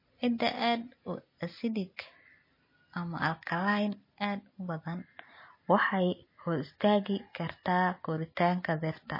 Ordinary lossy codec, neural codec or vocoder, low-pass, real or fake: MP3, 24 kbps; none; 5.4 kHz; real